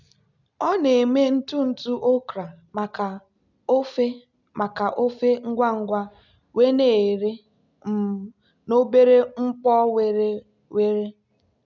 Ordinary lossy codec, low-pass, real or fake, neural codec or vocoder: none; 7.2 kHz; real; none